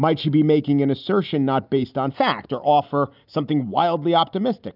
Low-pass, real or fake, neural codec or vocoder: 5.4 kHz; real; none